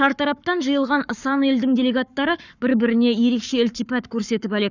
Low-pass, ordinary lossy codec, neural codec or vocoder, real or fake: 7.2 kHz; none; codec, 44.1 kHz, 7.8 kbps, Pupu-Codec; fake